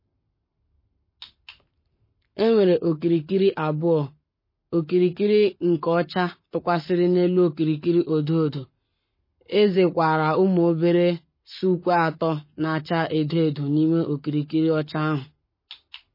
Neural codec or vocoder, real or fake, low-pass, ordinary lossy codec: codec, 44.1 kHz, 7.8 kbps, Pupu-Codec; fake; 5.4 kHz; MP3, 24 kbps